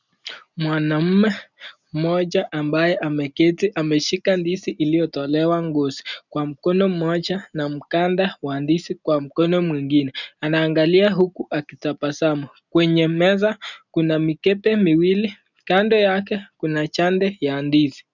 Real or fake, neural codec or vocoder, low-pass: real; none; 7.2 kHz